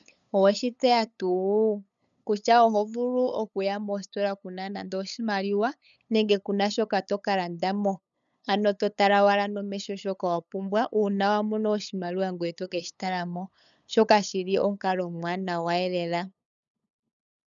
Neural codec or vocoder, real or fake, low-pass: codec, 16 kHz, 8 kbps, FunCodec, trained on LibriTTS, 25 frames a second; fake; 7.2 kHz